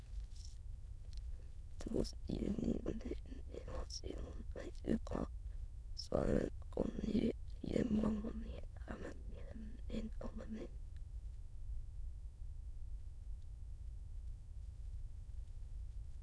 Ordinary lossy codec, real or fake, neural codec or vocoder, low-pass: none; fake; autoencoder, 22.05 kHz, a latent of 192 numbers a frame, VITS, trained on many speakers; none